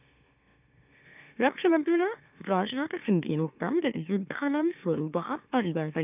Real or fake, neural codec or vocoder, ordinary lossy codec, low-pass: fake; autoencoder, 44.1 kHz, a latent of 192 numbers a frame, MeloTTS; none; 3.6 kHz